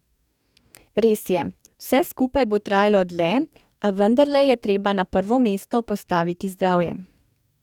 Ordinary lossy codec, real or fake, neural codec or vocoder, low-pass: none; fake; codec, 44.1 kHz, 2.6 kbps, DAC; 19.8 kHz